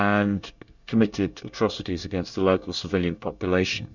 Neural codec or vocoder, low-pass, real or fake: codec, 24 kHz, 1 kbps, SNAC; 7.2 kHz; fake